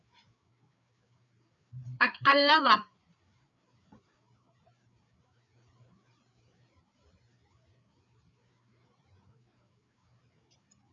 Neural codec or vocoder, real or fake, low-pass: codec, 16 kHz, 4 kbps, FreqCodec, larger model; fake; 7.2 kHz